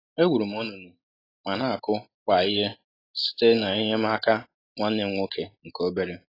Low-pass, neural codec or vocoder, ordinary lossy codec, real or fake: 5.4 kHz; none; AAC, 24 kbps; real